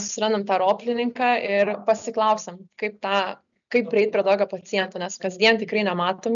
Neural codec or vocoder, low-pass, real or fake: none; 7.2 kHz; real